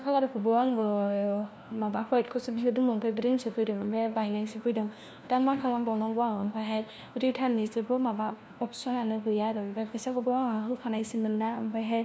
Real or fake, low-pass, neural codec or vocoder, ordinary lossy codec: fake; none; codec, 16 kHz, 1 kbps, FunCodec, trained on LibriTTS, 50 frames a second; none